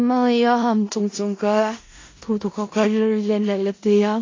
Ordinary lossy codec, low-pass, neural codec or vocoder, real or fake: AAC, 32 kbps; 7.2 kHz; codec, 16 kHz in and 24 kHz out, 0.4 kbps, LongCat-Audio-Codec, four codebook decoder; fake